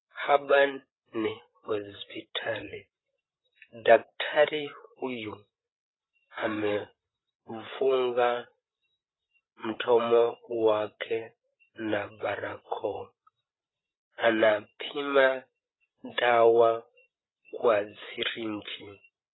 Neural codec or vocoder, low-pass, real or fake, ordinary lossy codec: codec, 16 kHz, 8 kbps, FreqCodec, larger model; 7.2 kHz; fake; AAC, 16 kbps